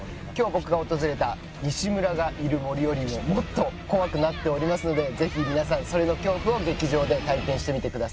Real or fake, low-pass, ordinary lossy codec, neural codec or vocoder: real; none; none; none